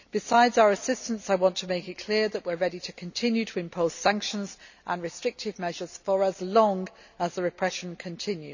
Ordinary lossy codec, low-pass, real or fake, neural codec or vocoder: none; 7.2 kHz; real; none